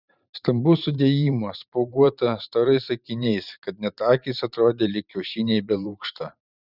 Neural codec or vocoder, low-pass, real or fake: vocoder, 44.1 kHz, 128 mel bands every 512 samples, BigVGAN v2; 5.4 kHz; fake